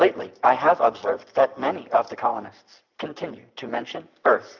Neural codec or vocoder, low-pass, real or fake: none; 7.2 kHz; real